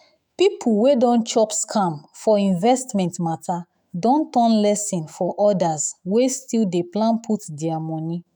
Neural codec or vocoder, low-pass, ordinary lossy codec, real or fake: autoencoder, 48 kHz, 128 numbers a frame, DAC-VAE, trained on Japanese speech; none; none; fake